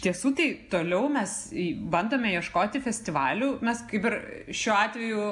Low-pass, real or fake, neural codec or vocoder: 10.8 kHz; real; none